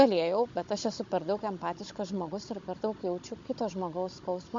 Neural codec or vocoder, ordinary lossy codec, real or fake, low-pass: codec, 16 kHz, 16 kbps, FunCodec, trained on Chinese and English, 50 frames a second; MP3, 48 kbps; fake; 7.2 kHz